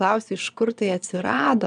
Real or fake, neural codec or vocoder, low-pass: fake; vocoder, 22.05 kHz, 80 mel bands, Vocos; 9.9 kHz